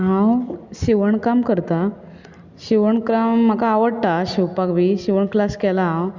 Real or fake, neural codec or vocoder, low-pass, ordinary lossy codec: real; none; 7.2 kHz; Opus, 64 kbps